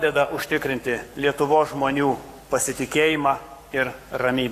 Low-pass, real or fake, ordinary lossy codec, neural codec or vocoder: 14.4 kHz; fake; AAC, 64 kbps; codec, 44.1 kHz, 7.8 kbps, Pupu-Codec